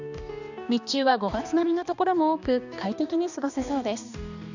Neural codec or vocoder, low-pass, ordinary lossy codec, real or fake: codec, 16 kHz, 2 kbps, X-Codec, HuBERT features, trained on balanced general audio; 7.2 kHz; none; fake